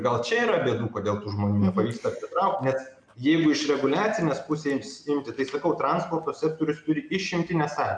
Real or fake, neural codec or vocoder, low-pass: real; none; 9.9 kHz